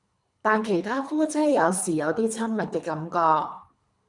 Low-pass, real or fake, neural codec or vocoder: 10.8 kHz; fake; codec, 24 kHz, 3 kbps, HILCodec